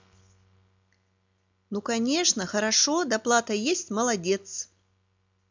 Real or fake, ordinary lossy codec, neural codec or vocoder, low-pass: real; MP3, 64 kbps; none; 7.2 kHz